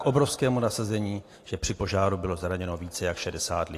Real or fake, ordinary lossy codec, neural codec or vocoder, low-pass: real; AAC, 48 kbps; none; 14.4 kHz